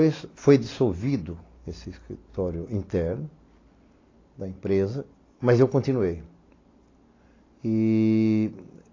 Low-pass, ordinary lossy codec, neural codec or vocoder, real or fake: 7.2 kHz; AAC, 32 kbps; none; real